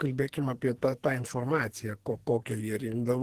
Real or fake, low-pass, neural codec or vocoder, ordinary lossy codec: fake; 14.4 kHz; codec, 44.1 kHz, 2.6 kbps, SNAC; Opus, 16 kbps